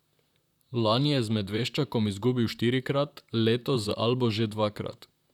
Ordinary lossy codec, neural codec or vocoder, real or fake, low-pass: none; vocoder, 44.1 kHz, 128 mel bands, Pupu-Vocoder; fake; 19.8 kHz